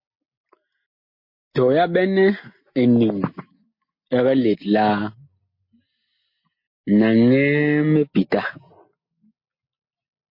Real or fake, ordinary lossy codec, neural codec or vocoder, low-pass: real; MP3, 32 kbps; none; 5.4 kHz